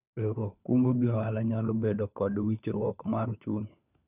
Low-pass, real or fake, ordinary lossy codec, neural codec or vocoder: 3.6 kHz; fake; none; codec, 16 kHz, 4 kbps, FunCodec, trained on LibriTTS, 50 frames a second